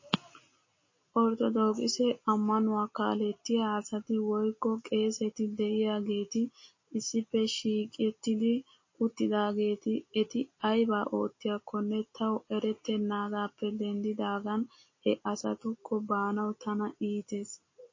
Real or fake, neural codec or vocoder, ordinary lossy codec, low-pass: real; none; MP3, 32 kbps; 7.2 kHz